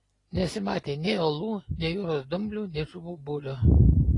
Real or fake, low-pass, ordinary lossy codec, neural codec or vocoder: real; 10.8 kHz; AAC, 32 kbps; none